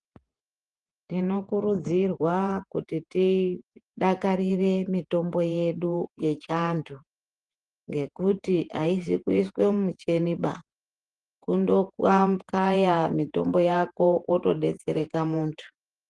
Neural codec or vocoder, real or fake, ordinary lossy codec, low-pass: vocoder, 48 kHz, 128 mel bands, Vocos; fake; Opus, 24 kbps; 10.8 kHz